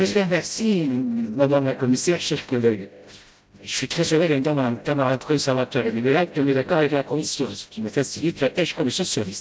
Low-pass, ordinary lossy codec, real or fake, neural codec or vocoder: none; none; fake; codec, 16 kHz, 0.5 kbps, FreqCodec, smaller model